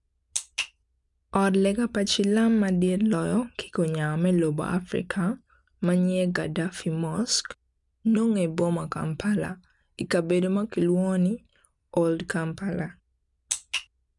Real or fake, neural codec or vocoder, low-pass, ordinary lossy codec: real; none; 10.8 kHz; none